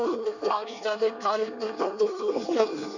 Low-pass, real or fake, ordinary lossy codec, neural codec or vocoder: 7.2 kHz; fake; none; codec, 24 kHz, 1 kbps, SNAC